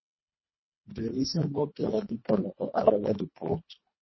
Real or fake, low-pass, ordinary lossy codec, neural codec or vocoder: fake; 7.2 kHz; MP3, 24 kbps; codec, 24 kHz, 1.5 kbps, HILCodec